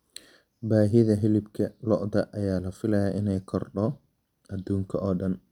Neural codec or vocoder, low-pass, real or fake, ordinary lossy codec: none; 19.8 kHz; real; none